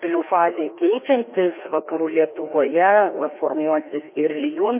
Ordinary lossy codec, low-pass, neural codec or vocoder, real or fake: MP3, 24 kbps; 3.6 kHz; codec, 16 kHz, 1 kbps, FreqCodec, larger model; fake